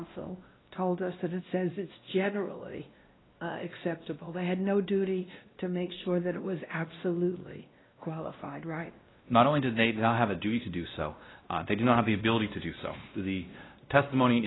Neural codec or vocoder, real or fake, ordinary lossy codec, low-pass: codec, 16 kHz, 1 kbps, X-Codec, WavLM features, trained on Multilingual LibriSpeech; fake; AAC, 16 kbps; 7.2 kHz